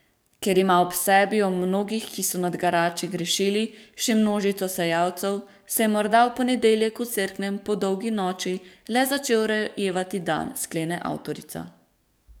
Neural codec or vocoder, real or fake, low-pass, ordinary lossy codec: codec, 44.1 kHz, 7.8 kbps, DAC; fake; none; none